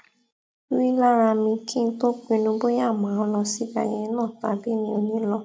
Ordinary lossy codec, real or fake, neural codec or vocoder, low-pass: none; real; none; none